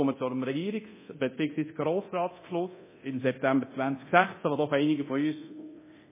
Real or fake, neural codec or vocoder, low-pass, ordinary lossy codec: fake; codec, 24 kHz, 0.9 kbps, DualCodec; 3.6 kHz; MP3, 16 kbps